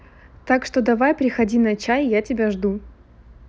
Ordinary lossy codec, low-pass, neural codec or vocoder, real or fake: none; none; none; real